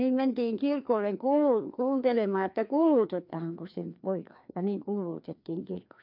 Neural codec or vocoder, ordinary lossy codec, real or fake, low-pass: codec, 16 kHz, 2 kbps, FreqCodec, larger model; MP3, 48 kbps; fake; 5.4 kHz